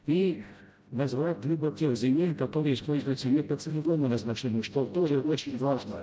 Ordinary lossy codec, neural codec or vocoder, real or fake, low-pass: none; codec, 16 kHz, 0.5 kbps, FreqCodec, smaller model; fake; none